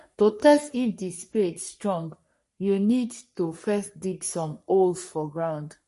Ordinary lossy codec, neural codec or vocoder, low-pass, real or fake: MP3, 48 kbps; codec, 44.1 kHz, 3.4 kbps, Pupu-Codec; 14.4 kHz; fake